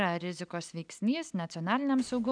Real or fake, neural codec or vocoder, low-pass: real; none; 9.9 kHz